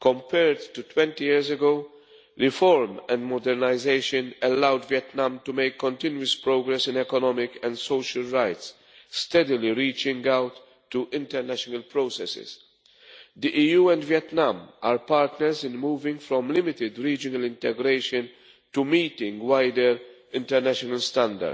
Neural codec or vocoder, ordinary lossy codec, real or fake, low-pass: none; none; real; none